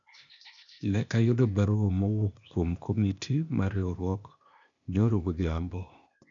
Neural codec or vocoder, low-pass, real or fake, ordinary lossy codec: codec, 16 kHz, 0.8 kbps, ZipCodec; 7.2 kHz; fake; MP3, 96 kbps